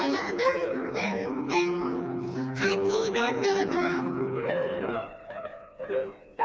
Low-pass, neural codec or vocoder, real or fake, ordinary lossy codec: none; codec, 16 kHz, 2 kbps, FreqCodec, smaller model; fake; none